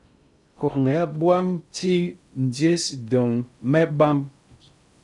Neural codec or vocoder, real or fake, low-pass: codec, 16 kHz in and 24 kHz out, 0.6 kbps, FocalCodec, streaming, 2048 codes; fake; 10.8 kHz